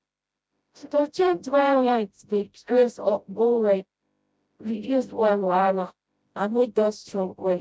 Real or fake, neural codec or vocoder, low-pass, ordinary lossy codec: fake; codec, 16 kHz, 0.5 kbps, FreqCodec, smaller model; none; none